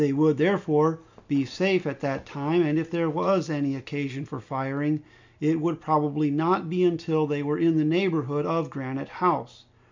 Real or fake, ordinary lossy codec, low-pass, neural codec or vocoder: real; MP3, 64 kbps; 7.2 kHz; none